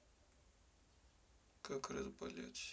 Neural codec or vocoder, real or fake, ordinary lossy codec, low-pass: none; real; none; none